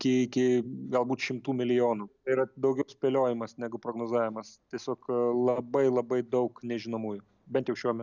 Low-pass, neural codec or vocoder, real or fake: 7.2 kHz; none; real